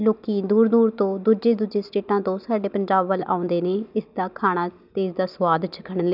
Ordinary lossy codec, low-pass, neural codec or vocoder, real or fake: none; 5.4 kHz; none; real